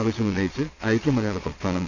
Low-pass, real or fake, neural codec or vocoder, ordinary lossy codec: 7.2 kHz; fake; vocoder, 22.05 kHz, 80 mel bands, Vocos; AAC, 48 kbps